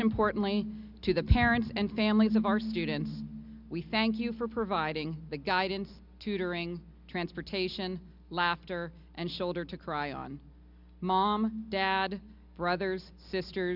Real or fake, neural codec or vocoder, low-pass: real; none; 5.4 kHz